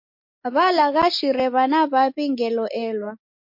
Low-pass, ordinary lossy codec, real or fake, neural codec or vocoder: 5.4 kHz; MP3, 32 kbps; real; none